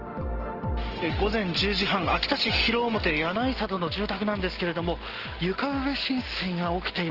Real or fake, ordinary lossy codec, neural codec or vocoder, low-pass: real; Opus, 16 kbps; none; 5.4 kHz